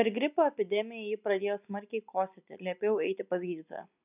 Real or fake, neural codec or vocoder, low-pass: real; none; 3.6 kHz